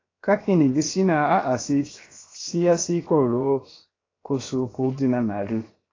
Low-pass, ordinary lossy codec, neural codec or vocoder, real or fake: 7.2 kHz; AAC, 32 kbps; codec, 16 kHz, 0.7 kbps, FocalCodec; fake